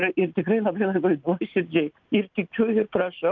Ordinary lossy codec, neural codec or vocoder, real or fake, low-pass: Opus, 24 kbps; none; real; 7.2 kHz